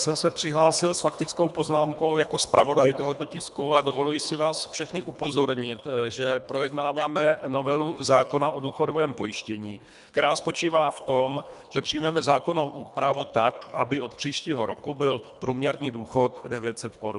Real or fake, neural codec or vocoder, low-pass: fake; codec, 24 kHz, 1.5 kbps, HILCodec; 10.8 kHz